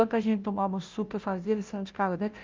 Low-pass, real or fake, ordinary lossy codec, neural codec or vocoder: 7.2 kHz; fake; Opus, 32 kbps; codec, 16 kHz, 0.5 kbps, FunCodec, trained on Chinese and English, 25 frames a second